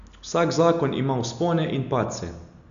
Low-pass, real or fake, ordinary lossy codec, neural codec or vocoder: 7.2 kHz; real; none; none